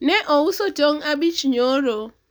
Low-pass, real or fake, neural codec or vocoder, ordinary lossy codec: none; real; none; none